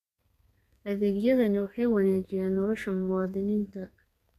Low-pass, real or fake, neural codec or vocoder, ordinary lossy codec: 14.4 kHz; fake; codec, 32 kHz, 1.9 kbps, SNAC; none